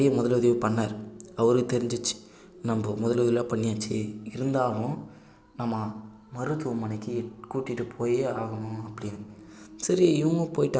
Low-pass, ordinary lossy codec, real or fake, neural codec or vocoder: none; none; real; none